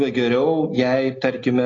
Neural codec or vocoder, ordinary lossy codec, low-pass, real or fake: none; AAC, 32 kbps; 7.2 kHz; real